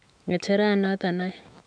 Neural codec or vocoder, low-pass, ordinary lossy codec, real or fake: autoencoder, 48 kHz, 128 numbers a frame, DAC-VAE, trained on Japanese speech; 9.9 kHz; none; fake